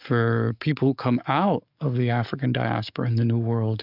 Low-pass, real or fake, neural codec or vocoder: 5.4 kHz; fake; codec, 44.1 kHz, 7.8 kbps, DAC